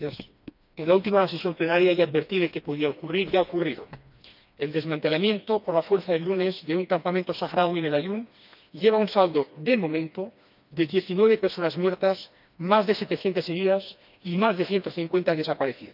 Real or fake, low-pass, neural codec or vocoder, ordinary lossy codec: fake; 5.4 kHz; codec, 16 kHz, 2 kbps, FreqCodec, smaller model; none